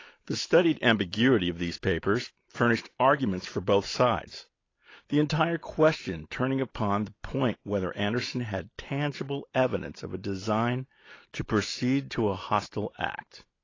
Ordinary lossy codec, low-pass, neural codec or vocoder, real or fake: AAC, 32 kbps; 7.2 kHz; none; real